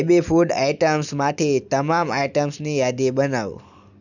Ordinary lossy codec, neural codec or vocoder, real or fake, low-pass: none; none; real; 7.2 kHz